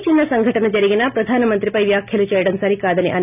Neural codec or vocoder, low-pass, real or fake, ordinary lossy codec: none; 3.6 kHz; real; none